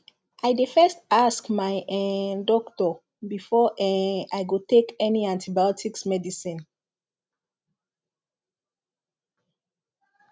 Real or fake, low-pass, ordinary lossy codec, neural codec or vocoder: real; none; none; none